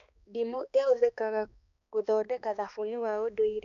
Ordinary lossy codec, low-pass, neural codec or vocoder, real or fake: AAC, 96 kbps; 7.2 kHz; codec, 16 kHz, 2 kbps, X-Codec, HuBERT features, trained on balanced general audio; fake